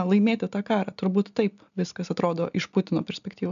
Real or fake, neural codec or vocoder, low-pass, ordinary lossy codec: real; none; 7.2 kHz; MP3, 96 kbps